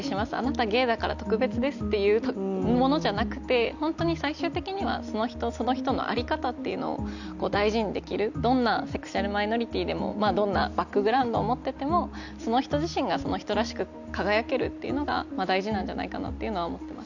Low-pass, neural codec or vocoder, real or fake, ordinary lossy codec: 7.2 kHz; none; real; none